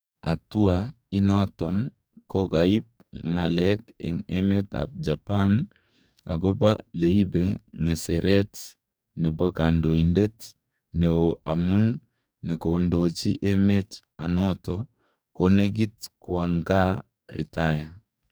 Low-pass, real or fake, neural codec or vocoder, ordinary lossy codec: none; fake; codec, 44.1 kHz, 2.6 kbps, DAC; none